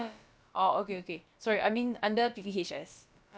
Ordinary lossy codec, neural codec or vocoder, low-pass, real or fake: none; codec, 16 kHz, about 1 kbps, DyCAST, with the encoder's durations; none; fake